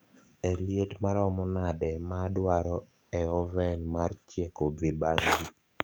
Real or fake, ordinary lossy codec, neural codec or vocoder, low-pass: fake; none; codec, 44.1 kHz, 7.8 kbps, DAC; none